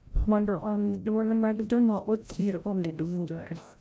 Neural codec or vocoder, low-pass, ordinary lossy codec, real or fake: codec, 16 kHz, 0.5 kbps, FreqCodec, larger model; none; none; fake